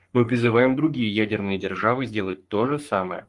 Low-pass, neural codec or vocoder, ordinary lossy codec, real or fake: 10.8 kHz; codec, 44.1 kHz, 3.4 kbps, Pupu-Codec; Opus, 32 kbps; fake